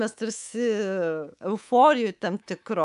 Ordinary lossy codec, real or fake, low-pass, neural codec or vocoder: AAC, 96 kbps; fake; 10.8 kHz; codec, 24 kHz, 3.1 kbps, DualCodec